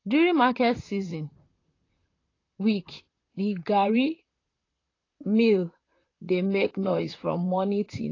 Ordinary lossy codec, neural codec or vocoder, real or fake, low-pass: AAC, 32 kbps; vocoder, 44.1 kHz, 128 mel bands, Pupu-Vocoder; fake; 7.2 kHz